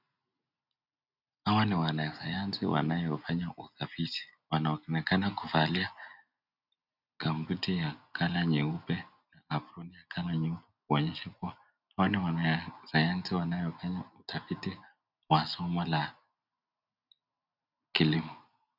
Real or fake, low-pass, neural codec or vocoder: real; 5.4 kHz; none